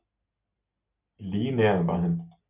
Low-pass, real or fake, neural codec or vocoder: 3.6 kHz; real; none